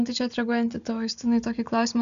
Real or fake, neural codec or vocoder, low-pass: real; none; 7.2 kHz